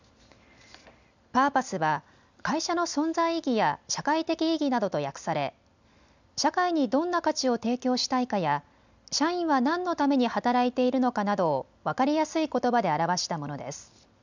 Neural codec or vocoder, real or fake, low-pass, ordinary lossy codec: none; real; 7.2 kHz; none